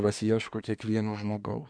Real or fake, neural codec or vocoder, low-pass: fake; codec, 24 kHz, 1 kbps, SNAC; 9.9 kHz